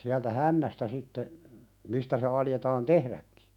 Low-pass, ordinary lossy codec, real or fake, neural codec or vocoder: 19.8 kHz; none; fake; autoencoder, 48 kHz, 128 numbers a frame, DAC-VAE, trained on Japanese speech